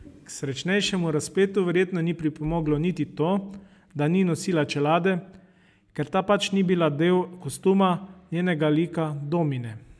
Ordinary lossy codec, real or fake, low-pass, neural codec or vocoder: none; real; none; none